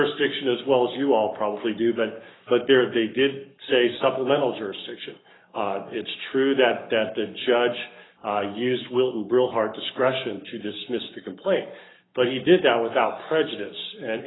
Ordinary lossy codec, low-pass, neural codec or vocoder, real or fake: AAC, 16 kbps; 7.2 kHz; codec, 44.1 kHz, 7.8 kbps, Pupu-Codec; fake